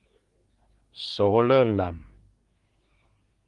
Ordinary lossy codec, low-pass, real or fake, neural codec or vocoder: Opus, 24 kbps; 10.8 kHz; fake; codec, 24 kHz, 1 kbps, SNAC